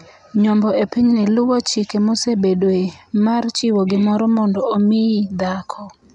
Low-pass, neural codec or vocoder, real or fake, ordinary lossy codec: 10.8 kHz; none; real; none